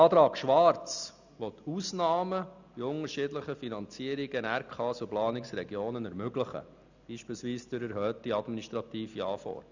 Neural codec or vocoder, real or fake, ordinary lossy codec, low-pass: none; real; none; 7.2 kHz